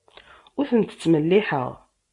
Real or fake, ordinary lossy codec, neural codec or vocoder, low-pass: real; MP3, 48 kbps; none; 10.8 kHz